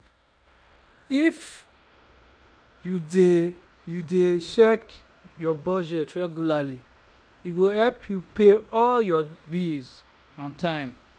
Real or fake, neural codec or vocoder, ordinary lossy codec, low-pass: fake; codec, 16 kHz in and 24 kHz out, 0.9 kbps, LongCat-Audio-Codec, fine tuned four codebook decoder; none; 9.9 kHz